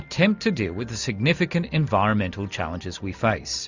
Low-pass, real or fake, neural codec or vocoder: 7.2 kHz; real; none